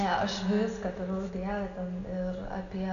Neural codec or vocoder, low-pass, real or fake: none; 7.2 kHz; real